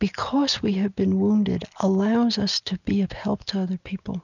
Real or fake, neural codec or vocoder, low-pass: real; none; 7.2 kHz